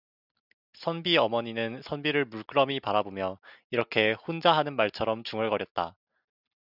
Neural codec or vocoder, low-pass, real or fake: none; 5.4 kHz; real